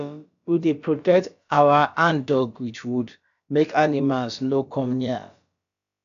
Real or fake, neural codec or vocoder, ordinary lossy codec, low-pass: fake; codec, 16 kHz, about 1 kbps, DyCAST, with the encoder's durations; none; 7.2 kHz